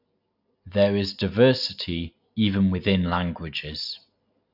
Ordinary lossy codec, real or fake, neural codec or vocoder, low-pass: none; real; none; 5.4 kHz